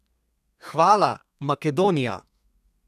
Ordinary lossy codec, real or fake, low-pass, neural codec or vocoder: none; fake; 14.4 kHz; codec, 32 kHz, 1.9 kbps, SNAC